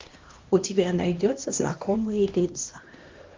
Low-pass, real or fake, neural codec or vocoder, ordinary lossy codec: 7.2 kHz; fake; codec, 16 kHz, 1 kbps, X-Codec, HuBERT features, trained on LibriSpeech; Opus, 32 kbps